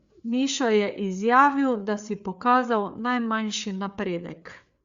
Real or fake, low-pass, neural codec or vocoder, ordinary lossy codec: fake; 7.2 kHz; codec, 16 kHz, 4 kbps, FreqCodec, larger model; Opus, 64 kbps